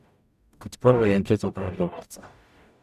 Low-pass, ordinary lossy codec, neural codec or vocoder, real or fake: 14.4 kHz; none; codec, 44.1 kHz, 0.9 kbps, DAC; fake